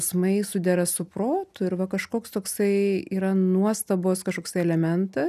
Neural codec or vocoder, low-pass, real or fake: none; 14.4 kHz; real